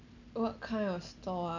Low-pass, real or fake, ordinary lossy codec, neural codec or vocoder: 7.2 kHz; real; none; none